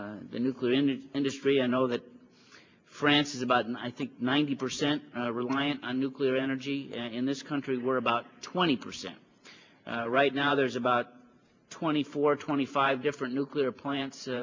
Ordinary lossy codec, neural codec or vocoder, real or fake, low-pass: MP3, 64 kbps; vocoder, 44.1 kHz, 128 mel bands every 512 samples, BigVGAN v2; fake; 7.2 kHz